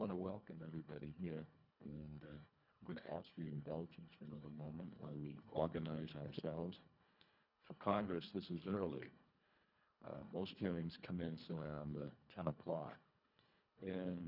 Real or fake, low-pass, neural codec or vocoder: fake; 5.4 kHz; codec, 24 kHz, 1.5 kbps, HILCodec